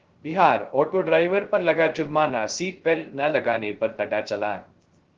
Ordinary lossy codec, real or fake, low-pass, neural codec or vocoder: Opus, 16 kbps; fake; 7.2 kHz; codec, 16 kHz, 0.3 kbps, FocalCodec